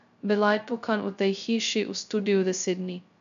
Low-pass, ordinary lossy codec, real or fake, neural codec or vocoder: 7.2 kHz; MP3, 96 kbps; fake; codec, 16 kHz, 0.2 kbps, FocalCodec